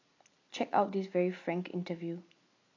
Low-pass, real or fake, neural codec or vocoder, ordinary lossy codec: 7.2 kHz; real; none; AAC, 32 kbps